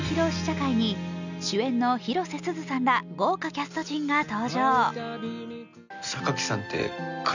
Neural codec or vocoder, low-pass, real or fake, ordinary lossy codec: none; 7.2 kHz; real; none